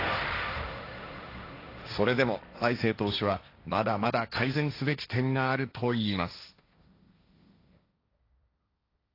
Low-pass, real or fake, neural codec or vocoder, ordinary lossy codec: 5.4 kHz; fake; codec, 16 kHz, 1.1 kbps, Voila-Tokenizer; AAC, 32 kbps